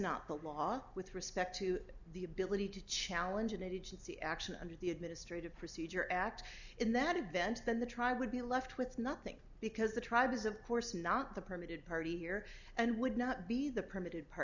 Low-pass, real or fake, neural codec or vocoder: 7.2 kHz; real; none